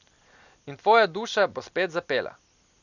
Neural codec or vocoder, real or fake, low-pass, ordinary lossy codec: none; real; 7.2 kHz; none